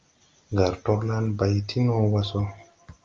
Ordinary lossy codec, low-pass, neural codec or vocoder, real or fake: Opus, 32 kbps; 7.2 kHz; none; real